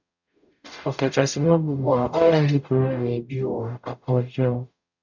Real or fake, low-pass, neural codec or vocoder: fake; 7.2 kHz; codec, 44.1 kHz, 0.9 kbps, DAC